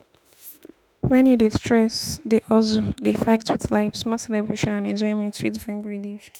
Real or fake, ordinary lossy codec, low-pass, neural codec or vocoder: fake; none; none; autoencoder, 48 kHz, 32 numbers a frame, DAC-VAE, trained on Japanese speech